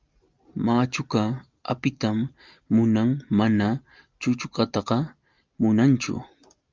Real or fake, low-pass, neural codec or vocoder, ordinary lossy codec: real; 7.2 kHz; none; Opus, 24 kbps